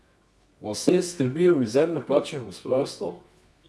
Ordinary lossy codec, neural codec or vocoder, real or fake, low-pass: none; codec, 24 kHz, 0.9 kbps, WavTokenizer, medium music audio release; fake; none